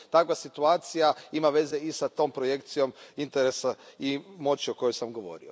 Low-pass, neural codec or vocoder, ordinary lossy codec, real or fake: none; none; none; real